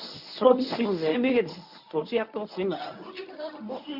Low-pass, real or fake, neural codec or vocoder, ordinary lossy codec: 5.4 kHz; fake; codec, 24 kHz, 0.9 kbps, WavTokenizer, medium speech release version 1; none